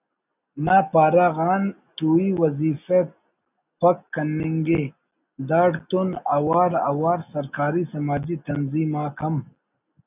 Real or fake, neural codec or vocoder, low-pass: real; none; 3.6 kHz